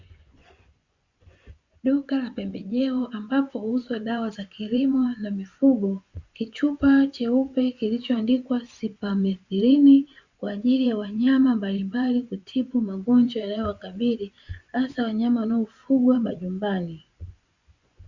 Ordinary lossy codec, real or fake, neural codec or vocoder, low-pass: Opus, 64 kbps; fake; vocoder, 24 kHz, 100 mel bands, Vocos; 7.2 kHz